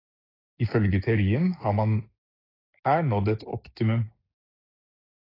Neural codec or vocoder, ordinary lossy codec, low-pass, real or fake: codec, 16 kHz, 6 kbps, DAC; AAC, 24 kbps; 5.4 kHz; fake